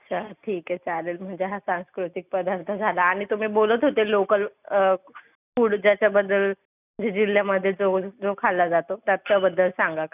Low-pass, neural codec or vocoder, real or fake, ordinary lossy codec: 3.6 kHz; none; real; none